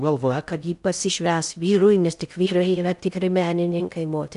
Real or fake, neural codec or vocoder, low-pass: fake; codec, 16 kHz in and 24 kHz out, 0.6 kbps, FocalCodec, streaming, 2048 codes; 10.8 kHz